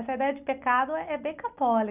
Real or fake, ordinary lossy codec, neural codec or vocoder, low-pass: real; none; none; 3.6 kHz